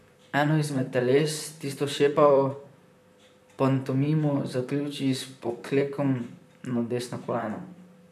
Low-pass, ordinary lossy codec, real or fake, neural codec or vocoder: 14.4 kHz; none; fake; vocoder, 44.1 kHz, 128 mel bands, Pupu-Vocoder